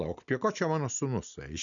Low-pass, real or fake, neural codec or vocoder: 7.2 kHz; real; none